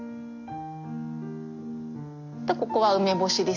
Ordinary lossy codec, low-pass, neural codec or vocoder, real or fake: none; 7.2 kHz; none; real